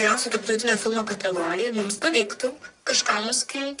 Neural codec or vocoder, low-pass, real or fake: codec, 44.1 kHz, 1.7 kbps, Pupu-Codec; 10.8 kHz; fake